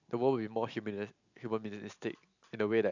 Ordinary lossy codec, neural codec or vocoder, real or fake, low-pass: none; none; real; 7.2 kHz